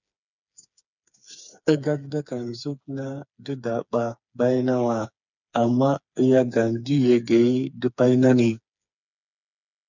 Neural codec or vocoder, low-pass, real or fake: codec, 16 kHz, 4 kbps, FreqCodec, smaller model; 7.2 kHz; fake